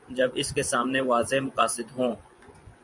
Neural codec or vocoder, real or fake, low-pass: vocoder, 24 kHz, 100 mel bands, Vocos; fake; 10.8 kHz